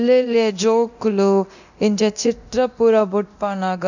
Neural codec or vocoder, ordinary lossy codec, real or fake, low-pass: codec, 24 kHz, 0.9 kbps, DualCodec; none; fake; 7.2 kHz